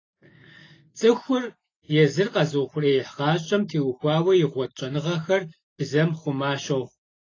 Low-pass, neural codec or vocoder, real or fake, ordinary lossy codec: 7.2 kHz; none; real; AAC, 32 kbps